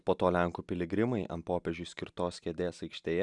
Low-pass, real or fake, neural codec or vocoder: 10.8 kHz; real; none